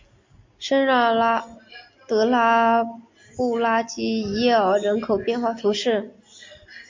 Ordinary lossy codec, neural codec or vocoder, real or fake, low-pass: MP3, 48 kbps; none; real; 7.2 kHz